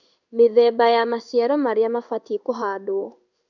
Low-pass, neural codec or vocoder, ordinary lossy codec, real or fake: 7.2 kHz; codec, 16 kHz in and 24 kHz out, 1 kbps, XY-Tokenizer; none; fake